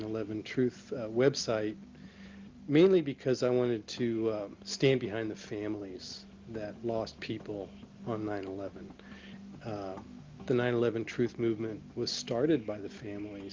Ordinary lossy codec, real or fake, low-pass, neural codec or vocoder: Opus, 24 kbps; real; 7.2 kHz; none